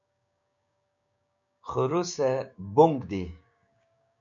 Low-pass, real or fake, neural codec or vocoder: 7.2 kHz; fake; codec, 16 kHz, 6 kbps, DAC